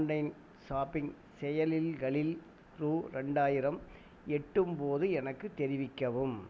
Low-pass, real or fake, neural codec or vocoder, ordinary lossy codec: none; real; none; none